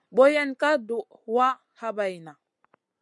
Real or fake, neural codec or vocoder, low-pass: real; none; 10.8 kHz